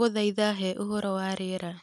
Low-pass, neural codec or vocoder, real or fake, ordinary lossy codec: 14.4 kHz; none; real; none